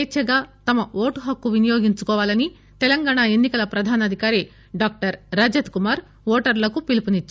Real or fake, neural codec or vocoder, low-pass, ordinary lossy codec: real; none; none; none